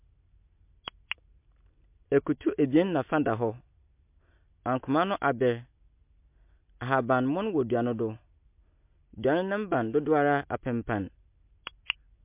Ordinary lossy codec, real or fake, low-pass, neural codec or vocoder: MP3, 32 kbps; real; 3.6 kHz; none